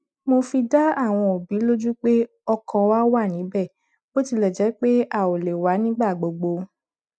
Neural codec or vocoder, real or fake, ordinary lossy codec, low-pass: none; real; none; none